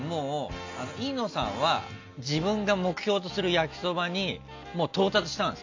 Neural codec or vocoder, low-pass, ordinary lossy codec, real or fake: none; 7.2 kHz; AAC, 48 kbps; real